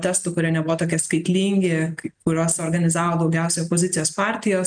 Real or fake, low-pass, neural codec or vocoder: real; 9.9 kHz; none